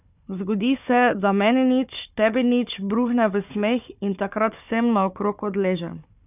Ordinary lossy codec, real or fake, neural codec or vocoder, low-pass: none; fake; codec, 16 kHz, 4 kbps, FunCodec, trained on Chinese and English, 50 frames a second; 3.6 kHz